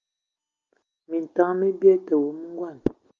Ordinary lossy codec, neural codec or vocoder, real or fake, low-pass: Opus, 24 kbps; none; real; 7.2 kHz